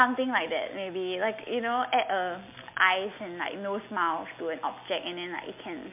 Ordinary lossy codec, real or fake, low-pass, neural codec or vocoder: MP3, 32 kbps; real; 3.6 kHz; none